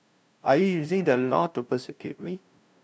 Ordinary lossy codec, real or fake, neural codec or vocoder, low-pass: none; fake; codec, 16 kHz, 0.5 kbps, FunCodec, trained on LibriTTS, 25 frames a second; none